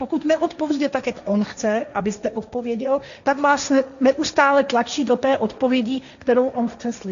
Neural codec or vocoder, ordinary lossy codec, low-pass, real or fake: codec, 16 kHz, 1.1 kbps, Voila-Tokenizer; AAC, 96 kbps; 7.2 kHz; fake